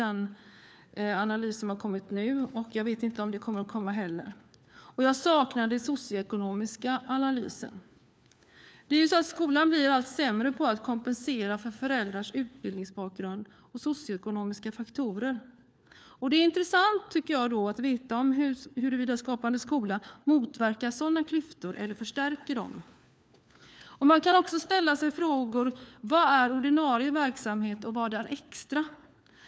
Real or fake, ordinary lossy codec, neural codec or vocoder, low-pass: fake; none; codec, 16 kHz, 4 kbps, FunCodec, trained on LibriTTS, 50 frames a second; none